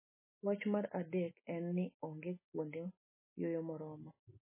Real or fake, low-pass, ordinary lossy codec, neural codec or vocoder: real; 3.6 kHz; MP3, 16 kbps; none